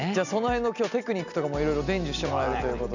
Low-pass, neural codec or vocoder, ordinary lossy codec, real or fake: 7.2 kHz; none; none; real